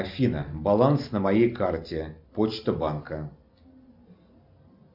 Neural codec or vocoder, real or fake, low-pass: none; real; 5.4 kHz